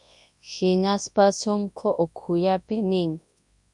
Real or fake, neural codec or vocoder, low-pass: fake; codec, 24 kHz, 0.9 kbps, WavTokenizer, large speech release; 10.8 kHz